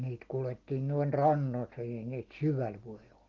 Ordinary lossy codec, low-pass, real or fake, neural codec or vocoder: Opus, 16 kbps; 7.2 kHz; real; none